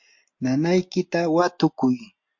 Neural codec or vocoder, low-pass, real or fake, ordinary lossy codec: none; 7.2 kHz; real; MP3, 48 kbps